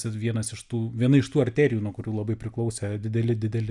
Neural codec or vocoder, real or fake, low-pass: none; real; 10.8 kHz